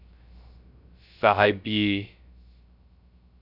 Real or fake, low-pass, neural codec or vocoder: fake; 5.4 kHz; codec, 16 kHz, 0.3 kbps, FocalCodec